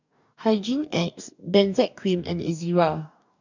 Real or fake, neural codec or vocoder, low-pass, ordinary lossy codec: fake; codec, 44.1 kHz, 2.6 kbps, DAC; 7.2 kHz; none